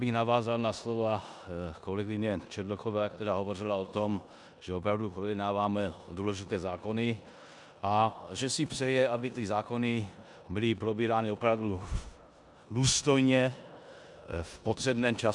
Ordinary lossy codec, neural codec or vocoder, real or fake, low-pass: MP3, 96 kbps; codec, 16 kHz in and 24 kHz out, 0.9 kbps, LongCat-Audio-Codec, four codebook decoder; fake; 10.8 kHz